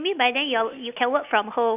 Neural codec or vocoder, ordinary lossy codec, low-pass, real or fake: none; none; 3.6 kHz; real